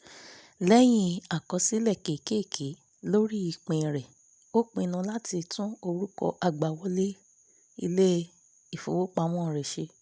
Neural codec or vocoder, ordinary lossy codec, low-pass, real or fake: none; none; none; real